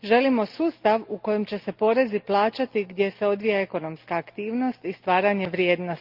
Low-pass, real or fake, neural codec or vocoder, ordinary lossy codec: 5.4 kHz; real; none; Opus, 32 kbps